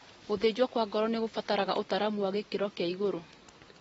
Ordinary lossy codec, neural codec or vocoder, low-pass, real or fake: AAC, 24 kbps; none; 19.8 kHz; real